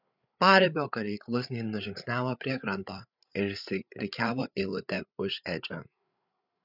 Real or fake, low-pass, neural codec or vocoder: fake; 5.4 kHz; codec, 16 kHz, 8 kbps, FreqCodec, larger model